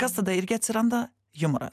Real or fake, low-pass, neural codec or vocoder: real; 14.4 kHz; none